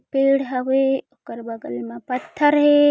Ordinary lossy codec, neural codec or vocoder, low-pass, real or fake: none; none; none; real